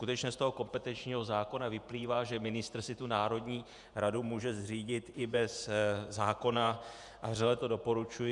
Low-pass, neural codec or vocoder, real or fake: 10.8 kHz; vocoder, 48 kHz, 128 mel bands, Vocos; fake